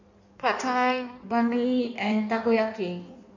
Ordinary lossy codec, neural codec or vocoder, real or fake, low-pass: none; codec, 16 kHz in and 24 kHz out, 1.1 kbps, FireRedTTS-2 codec; fake; 7.2 kHz